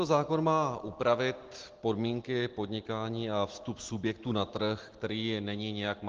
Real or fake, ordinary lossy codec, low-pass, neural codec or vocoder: real; Opus, 16 kbps; 7.2 kHz; none